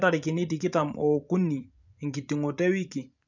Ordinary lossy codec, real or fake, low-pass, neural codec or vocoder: none; fake; 7.2 kHz; vocoder, 24 kHz, 100 mel bands, Vocos